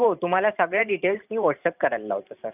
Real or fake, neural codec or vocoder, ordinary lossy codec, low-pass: fake; vocoder, 44.1 kHz, 128 mel bands every 512 samples, BigVGAN v2; AAC, 32 kbps; 3.6 kHz